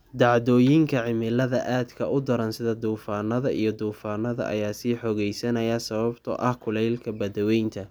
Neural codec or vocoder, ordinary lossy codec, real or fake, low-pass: none; none; real; none